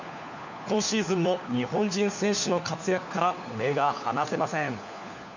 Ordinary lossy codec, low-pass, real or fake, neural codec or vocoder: none; 7.2 kHz; fake; codec, 16 kHz, 4 kbps, FunCodec, trained on LibriTTS, 50 frames a second